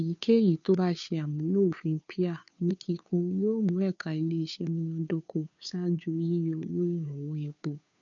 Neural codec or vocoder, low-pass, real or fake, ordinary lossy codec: codec, 16 kHz, 2 kbps, FunCodec, trained on Chinese and English, 25 frames a second; 7.2 kHz; fake; none